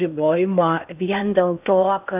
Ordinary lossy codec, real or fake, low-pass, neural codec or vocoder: AAC, 32 kbps; fake; 3.6 kHz; codec, 16 kHz in and 24 kHz out, 0.6 kbps, FocalCodec, streaming, 2048 codes